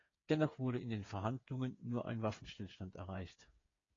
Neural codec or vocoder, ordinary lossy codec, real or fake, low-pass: codec, 16 kHz, 8 kbps, FreqCodec, smaller model; AAC, 32 kbps; fake; 7.2 kHz